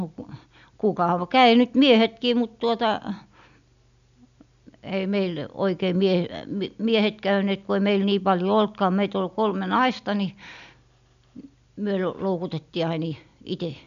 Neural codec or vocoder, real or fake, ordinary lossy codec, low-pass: none; real; none; 7.2 kHz